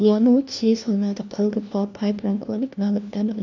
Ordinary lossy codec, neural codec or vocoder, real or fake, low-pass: none; codec, 16 kHz, 1 kbps, FunCodec, trained on LibriTTS, 50 frames a second; fake; 7.2 kHz